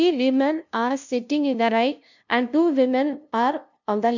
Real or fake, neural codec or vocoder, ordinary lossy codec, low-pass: fake; codec, 16 kHz, 0.5 kbps, FunCodec, trained on LibriTTS, 25 frames a second; none; 7.2 kHz